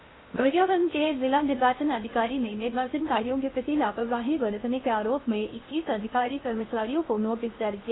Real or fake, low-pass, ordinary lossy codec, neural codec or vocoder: fake; 7.2 kHz; AAC, 16 kbps; codec, 16 kHz in and 24 kHz out, 0.6 kbps, FocalCodec, streaming, 2048 codes